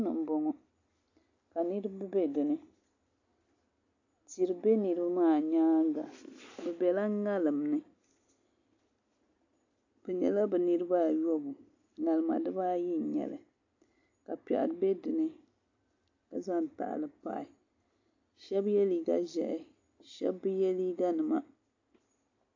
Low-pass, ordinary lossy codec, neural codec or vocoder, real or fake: 7.2 kHz; AAC, 48 kbps; none; real